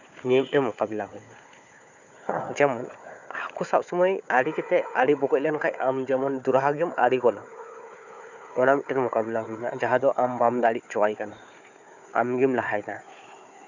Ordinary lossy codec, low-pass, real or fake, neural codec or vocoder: none; 7.2 kHz; fake; codec, 16 kHz, 4 kbps, FunCodec, trained on Chinese and English, 50 frames a second